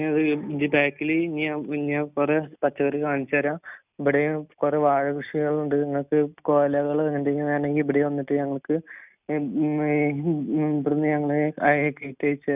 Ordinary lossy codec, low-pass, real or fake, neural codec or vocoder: none; 3.6 kHz; real; none